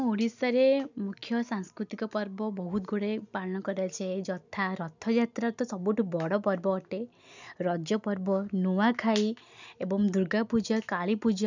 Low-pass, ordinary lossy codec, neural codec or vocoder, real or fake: 7.2 kHz; none; none; real